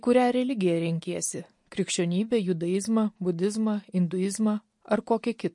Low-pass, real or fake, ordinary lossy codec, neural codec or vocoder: 10.8 kHz; real; MP3, 48 kbps; none